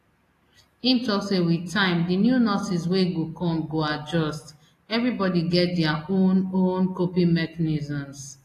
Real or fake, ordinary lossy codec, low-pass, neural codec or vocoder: real; AAC, 48 kbps; 14.4 kHz; none